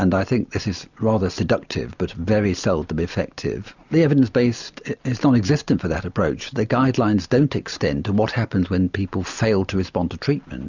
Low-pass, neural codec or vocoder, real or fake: 7.2 kHz; none; real